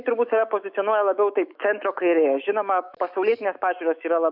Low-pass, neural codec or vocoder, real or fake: 5.4 kHz; autoencoder, 48 kHz, 128 numbers a frame, DAC-VAE, trained on Japanese speech; fake